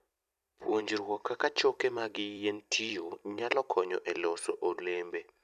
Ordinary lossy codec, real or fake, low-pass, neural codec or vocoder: none; fake; 14.4 kHz; vocoder, 44.1 kHz, 128 mel bands every 256 samples, BigVGAN v2